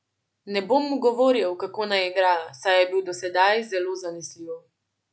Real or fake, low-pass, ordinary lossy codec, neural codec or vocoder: real; none; none; none